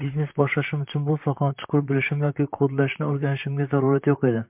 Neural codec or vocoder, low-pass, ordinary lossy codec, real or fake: vocoder, 44.1 kHz, 128 mel bands, Pupu-Vocoder; 3.6 kHz; MP3, 32 kbps; fake